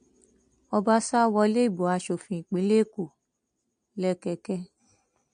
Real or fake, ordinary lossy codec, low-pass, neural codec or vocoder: real; MP3, 48 kbps; 9.9 kHz; none